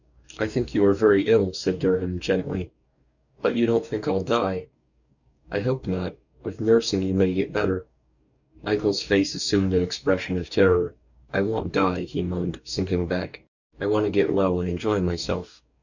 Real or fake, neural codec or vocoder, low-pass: fake; codec, 44.1 kHz, 2.6 kbps, DAC; 7.2 kHz